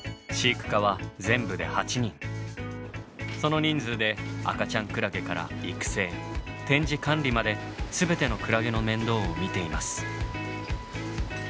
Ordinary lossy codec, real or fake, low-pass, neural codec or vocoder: none; real; none; none